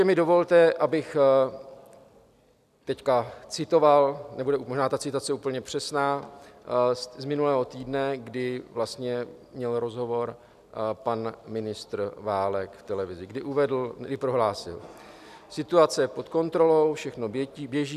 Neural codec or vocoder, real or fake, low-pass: none; real; 14.4 kHz